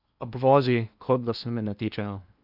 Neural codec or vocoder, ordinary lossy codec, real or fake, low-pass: codec, 16 kHz in and 24 kHz out, 0.8 kbps, FocalCodec, streaming, 65536 codes; none; fake; 5.4 kHz